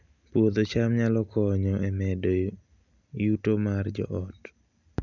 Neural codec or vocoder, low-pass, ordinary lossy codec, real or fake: none; 7.2 kHz; none; real